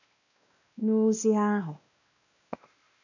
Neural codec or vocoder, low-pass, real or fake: codec, 16 kHz, 1 kbps, X-Codec, WavLM features, trained on Multilingual LibriSpeech; 7.2 kHz; fake